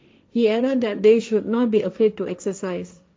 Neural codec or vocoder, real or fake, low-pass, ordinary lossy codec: codec, 16 kHz, 1.1 kbps, Voila-Tokenizer; fake; none; none